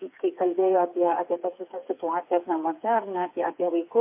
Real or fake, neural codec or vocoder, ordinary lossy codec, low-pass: fake; codec, 16 kHz, 4 kbps, FreqCodec, smaller model; MP3, 24 kbps; 3.6 kHz